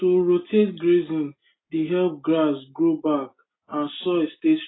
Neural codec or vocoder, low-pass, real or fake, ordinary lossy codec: none; 7.2 kHz; real; AAC, 16 kbps